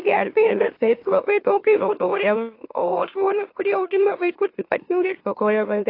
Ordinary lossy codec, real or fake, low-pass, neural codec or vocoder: AAC, 32 kbps; fake; 5.4 kHz; autoencoder, 44.1 kHz, a latent of 192 numbers a frame, MeloTTS